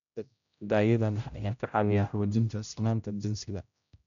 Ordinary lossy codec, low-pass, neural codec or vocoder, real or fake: none; 7.2 kHz; codec, 16 kHz, 0.5 kbps, X-Codec, HuBERT features, trained on general audio; fake